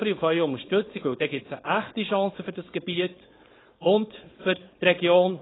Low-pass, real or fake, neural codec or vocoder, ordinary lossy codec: 7.2 kHz; fake; codec, 16 kHz, 4.8 kbps, FACodec; AAC, 16 kbps